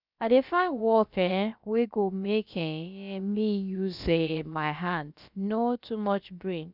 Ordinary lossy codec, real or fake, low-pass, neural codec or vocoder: none; fake; 5.4 kHz; codec, 16 kHz, about 1 kbps, DyCAST, with the encoder's durations